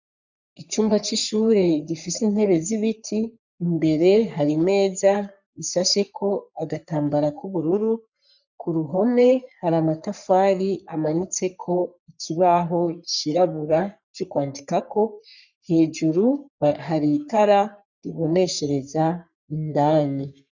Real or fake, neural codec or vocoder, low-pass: fake; codec, 44.1 kHz, 3.4 kbps, Pupu-Codec; 7.2 kHz